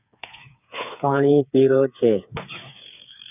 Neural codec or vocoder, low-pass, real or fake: codec, 16 kHz, 4 kbps, FreqCodec, smaller model; 3.6 kHz; fake